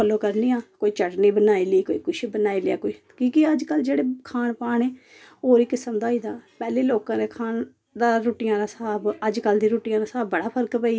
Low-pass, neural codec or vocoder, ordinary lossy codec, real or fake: none; none; none; real